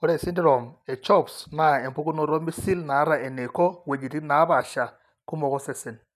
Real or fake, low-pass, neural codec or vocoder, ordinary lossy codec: real; 14.4 kHz; none; none